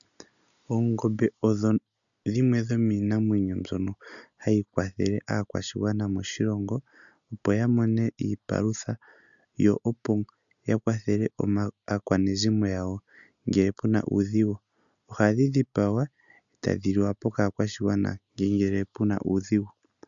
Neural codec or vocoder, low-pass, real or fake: none; 7.2 kHz; real